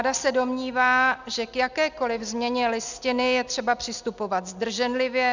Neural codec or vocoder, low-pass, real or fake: none; 7.2 kHz; real